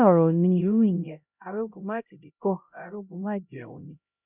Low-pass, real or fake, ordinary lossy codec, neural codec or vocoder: 3.6 kHz; fake; none; codec, 16 kHz, 0.5 kbps, X-Codec, HuBERT features, trained on LibriSpeech